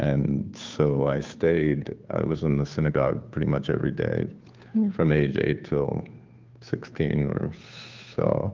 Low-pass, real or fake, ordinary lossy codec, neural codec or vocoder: 7.2 kHz; fake; Opus, 32 kbps; codec, 16 kHz, 2 kbps, FunCodec, trained on Chinese and English, 25 frames a second